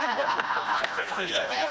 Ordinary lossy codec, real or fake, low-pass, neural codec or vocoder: none; fake; none; codec, 16 kHz, 1 kbps, FreqCodec, smaller model